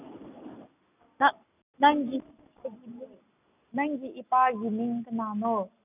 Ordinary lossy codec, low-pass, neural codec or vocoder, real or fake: none; 3.6 kHz; none; real